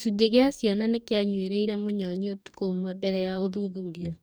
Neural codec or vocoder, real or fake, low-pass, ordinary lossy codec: codec, 44.1 kHz, 2.6 kbps, DAC; fake; none; none